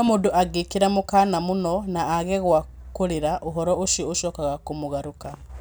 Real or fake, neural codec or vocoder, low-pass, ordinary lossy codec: real; none; none; none